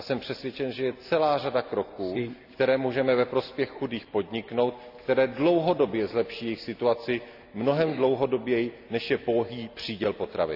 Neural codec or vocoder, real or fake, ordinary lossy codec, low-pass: none; real; none; 5.4 kHz